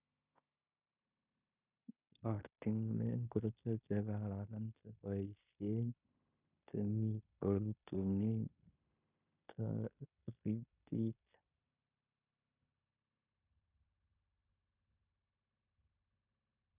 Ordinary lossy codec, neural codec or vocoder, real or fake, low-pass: none; codec, 16 kHz in and 24 kHz out, 0.9 kbps, LongCat-Audio-Codec, fine tuned four codebook decoder; fake; 3.6 kHz